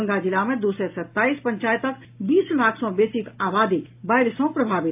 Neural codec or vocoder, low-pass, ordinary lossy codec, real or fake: none; 3.6 kHz; AAC, 32 kbps; real